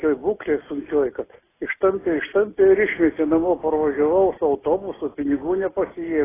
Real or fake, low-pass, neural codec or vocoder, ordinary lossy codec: real; 3.6 kHz; none; AAC, 16 kbps